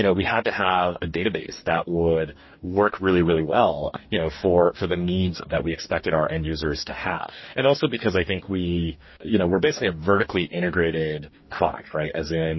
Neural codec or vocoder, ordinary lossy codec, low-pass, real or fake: codec, 44.1 kHz, 2.6 kbps, DAC; MP3, 24 kbps; 7.2 kHz; fake